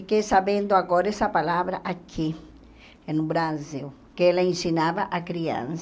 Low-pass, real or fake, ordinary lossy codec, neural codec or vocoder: none; real; none; none